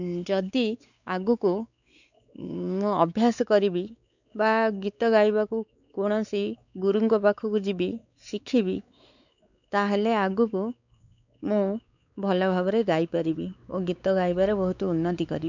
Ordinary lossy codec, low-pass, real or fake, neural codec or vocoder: none; 7.2 kHz; fake; codec, 16 kHz, 4 kbps, X-Codec, WavLM features, trained on Multilingual LibriSpeech